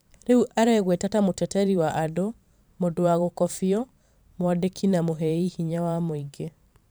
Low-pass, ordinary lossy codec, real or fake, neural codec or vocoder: none; none; fake; vocoder, 44.1 kHz, 128 mel bands every 512 samples, BigVGAN v2